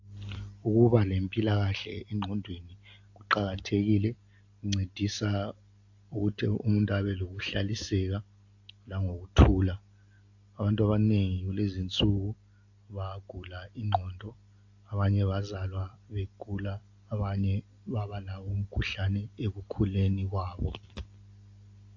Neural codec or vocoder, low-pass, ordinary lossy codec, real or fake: none; 7.2 kHz; AAC, 48 kbps; real